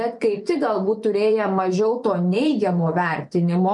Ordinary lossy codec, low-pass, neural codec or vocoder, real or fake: MP3, 64 kbps; 10.8 kHz; vocoder, 24 kHz, 100 mel bands, Vocos; fake